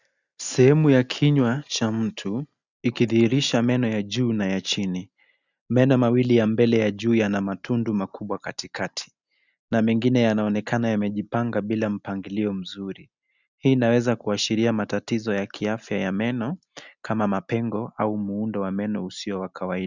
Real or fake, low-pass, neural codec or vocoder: real; 7.2 kHz; none